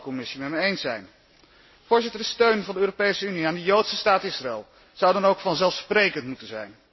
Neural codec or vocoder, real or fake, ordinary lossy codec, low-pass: none; real; MP3, 24 kbps; 7.2 kHz